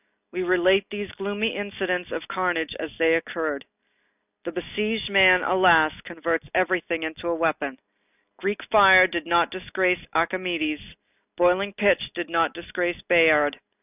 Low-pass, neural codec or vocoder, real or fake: 3.6 kHz; none; real